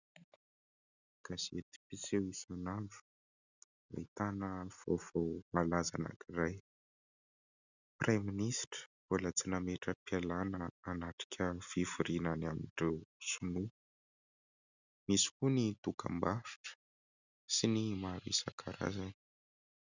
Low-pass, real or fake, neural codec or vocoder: 7.2 kHz; real; none